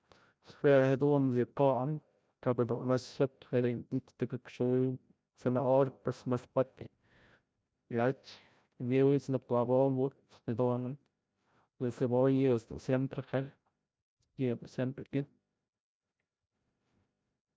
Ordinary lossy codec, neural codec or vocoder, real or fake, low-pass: none; codec, 16 kHz, 0.5 kbps, FreqCodec, larger model; fake; none